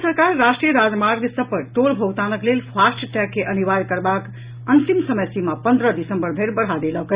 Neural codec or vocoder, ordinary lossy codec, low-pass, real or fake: none; Opus, 64 kbps; 3.6 kHz; real